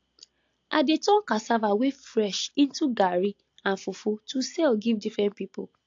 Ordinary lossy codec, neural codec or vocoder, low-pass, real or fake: AAC, 48 kbps; none; 7.2 kHz; real